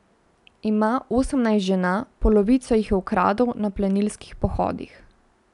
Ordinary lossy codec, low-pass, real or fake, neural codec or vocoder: none; 10.8 kHz; real; none